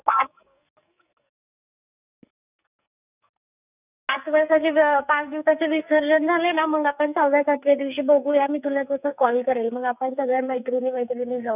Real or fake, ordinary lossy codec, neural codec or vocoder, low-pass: fake; none; codec, 44.1 kHz, 2.6 kbps, SNAC; 3.6 kHz